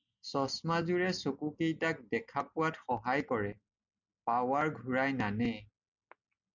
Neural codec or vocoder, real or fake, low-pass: none; real; 7.2 kHz